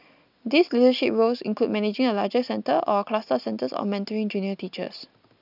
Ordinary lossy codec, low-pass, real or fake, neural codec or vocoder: none; 5.4 kHz; real; none